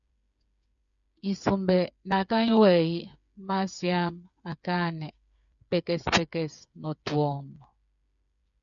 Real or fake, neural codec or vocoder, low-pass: fake; codec, 16 kHz, 8 kbps, FreqCodec, smaller model; 7.2 kHz